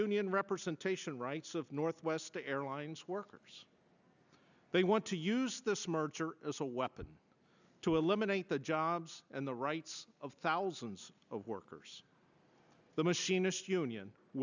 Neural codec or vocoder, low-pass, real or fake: none; 7.2 kHz; real